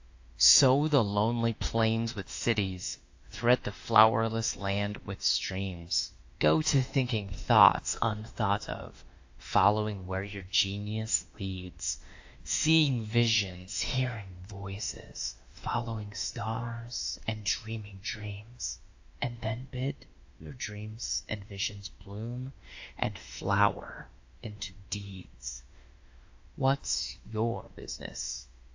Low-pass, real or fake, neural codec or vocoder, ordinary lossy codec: 7.2 kHz; fake; autoencoder, 48 kHz, 32 numbers a frame, DAC-VAE, trained on Japanese speech; AAC, 48 kbps